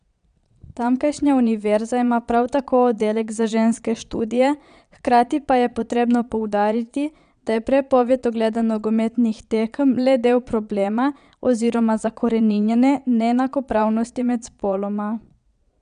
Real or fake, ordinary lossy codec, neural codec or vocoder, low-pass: fake; none; vocoder, 22.05 kHz, 80 mel bands, Vocos; 9.9 kHz